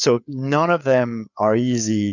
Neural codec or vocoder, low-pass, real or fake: codec, 16 kHz, 4 kbps, FreqCodec, larger model; 7.2 kHz; fake